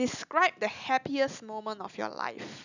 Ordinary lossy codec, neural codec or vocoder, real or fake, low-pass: none; none; real; 7.2 kHz